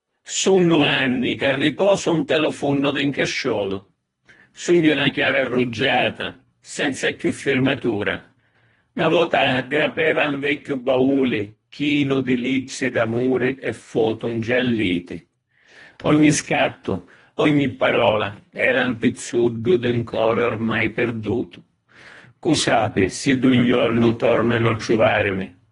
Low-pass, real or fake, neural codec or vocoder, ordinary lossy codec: 10.8 kHz; fake; codec, 24 kHz, 1.5 kbps, HILCodec; AAC, 32 kbps